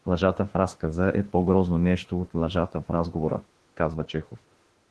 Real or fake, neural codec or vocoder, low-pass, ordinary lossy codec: fake; autoencoder, 48 kHz, 32 numbers a frame, DAC-VAE, trained on Japanese speech; 10.8 kHz; Opus, 16 kbps